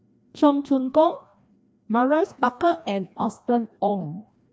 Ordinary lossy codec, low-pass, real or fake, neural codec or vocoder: none; none; fake; codec, 16 kHz, 1 kbps, FreqCodec, larger model